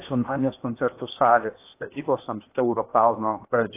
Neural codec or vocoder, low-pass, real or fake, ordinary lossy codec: codec, 16 kHz in and 24 kHz out, 0.8 kbps, FocalCodec, streaming, 65536 codes; 3.6 kHz; fake; AAC, 24 kbps